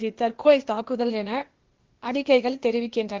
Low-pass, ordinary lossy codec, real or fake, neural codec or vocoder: 7.2 kHz; Opus, 16 kbps; fake; codec, 16 kHz, 0.8 kbps, ZipCodec